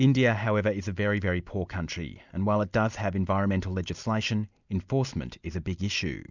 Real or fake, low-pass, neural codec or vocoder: real; 7.2 kHz; none